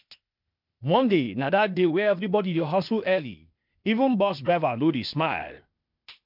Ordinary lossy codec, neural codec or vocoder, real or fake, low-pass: none; codec, 16 kHz, 0.8 kbps, ZipCodec; fake; 5.4 kHz